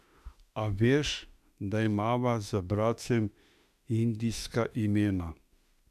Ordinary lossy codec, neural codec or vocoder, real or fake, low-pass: none; autoencoder, 48 kHz, 32 numbers a frame, DAC-VAE, trained on Japanese speech; fake; 14.4 kHz